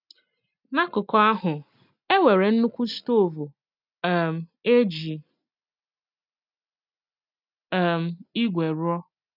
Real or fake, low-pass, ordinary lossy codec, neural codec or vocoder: real; 5.4 kHz; none; none